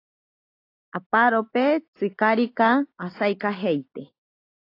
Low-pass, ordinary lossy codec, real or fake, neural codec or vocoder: 5.4 kHz; AAC, 32 kbps; real; none